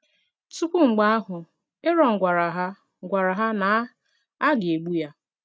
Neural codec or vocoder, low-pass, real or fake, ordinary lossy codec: none; none; real; none